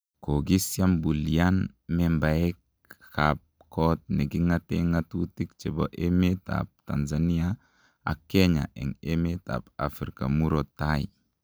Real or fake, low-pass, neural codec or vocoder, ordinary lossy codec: real; none; none; none